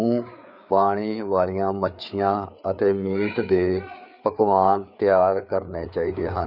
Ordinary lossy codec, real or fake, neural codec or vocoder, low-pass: none; fake; codec, 16 kHz, 4 kbps, FreqCodec, larger model; 5.4 kHz